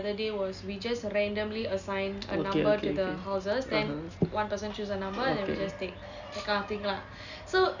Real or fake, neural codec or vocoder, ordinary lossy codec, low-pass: real; none; none; 7.2 kHz